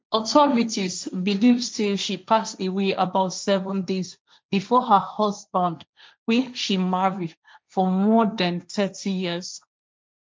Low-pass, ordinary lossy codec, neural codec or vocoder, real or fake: none; none; codec, 16 kHz, 1.1 kbps, Voila-Tokenizer; fake